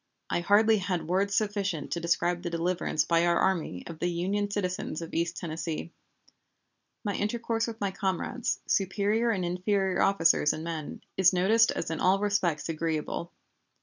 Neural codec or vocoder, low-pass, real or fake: none; 7.2 kHz; real